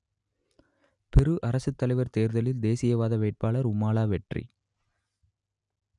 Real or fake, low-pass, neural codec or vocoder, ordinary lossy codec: real; 10.8 kHz; none; none